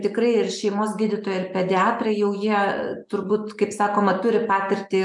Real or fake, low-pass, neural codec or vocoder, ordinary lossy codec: real; 10.8 kHz; none; MP3, 96 kbps